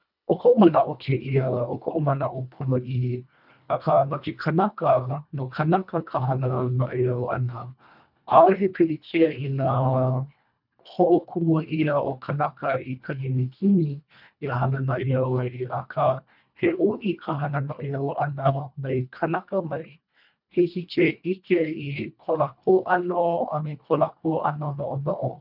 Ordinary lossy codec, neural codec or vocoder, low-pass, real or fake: none; codec, 24 kHz, 1.5 kbps, HILCodec; 5.4 kHz; fake